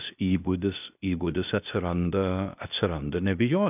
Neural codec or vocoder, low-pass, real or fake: codec, 16 kHz, 0.8 kbps, ZipCodec; 3.6 kHz; fake